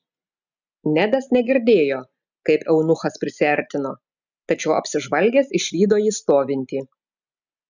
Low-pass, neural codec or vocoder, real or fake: 7.2 kHz; none; real